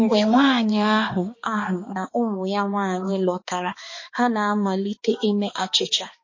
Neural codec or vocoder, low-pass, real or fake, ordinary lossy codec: codec, 16 kHz, 2 kbps, X-Codec, HuBERT features, trained on balanced general audio; 7.2 kHz; fake; MP3, 32 kbps